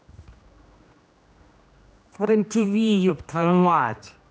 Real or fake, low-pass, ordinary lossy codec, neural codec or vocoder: fake; none; none; codec, 16 kHz, 1 kbps, X-Codec, HuBERT features, trained on general audio